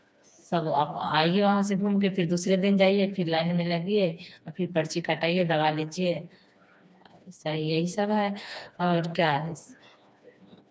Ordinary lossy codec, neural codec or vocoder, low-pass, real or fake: none; codec, 16 kHz, 2 kbps, FreqCodec, smaller model; none; fake